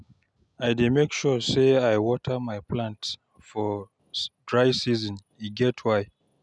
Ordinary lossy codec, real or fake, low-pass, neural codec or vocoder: none; real; none; none